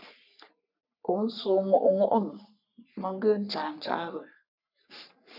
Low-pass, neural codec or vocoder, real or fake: 5.4 kHz; codec, 44.1 kHz, 3.4 kbps, Pupu-Codec; fake